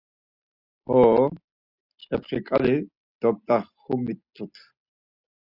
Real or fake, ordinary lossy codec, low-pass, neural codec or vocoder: real; Opus, 64 kbps; 5.4 kHz; none